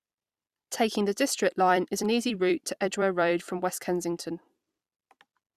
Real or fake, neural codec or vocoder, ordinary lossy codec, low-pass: fake; vocoder, 44.1 kHz, 128 mel bands every 256 samples, BigVGAN v2; Opus, 64 kbps; 14.4 kHz